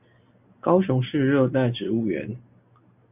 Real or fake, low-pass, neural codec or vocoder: real; 3.6 kHz; none